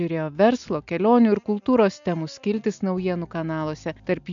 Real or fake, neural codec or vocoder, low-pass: real; none; 7.2 kHz